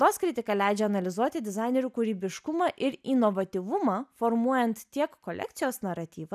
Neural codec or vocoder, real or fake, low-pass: none; real; 14.4 kHz